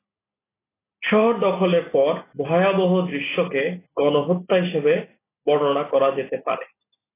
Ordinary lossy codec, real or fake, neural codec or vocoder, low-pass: AAC, 16 kbps; real; none; 3.6 kHz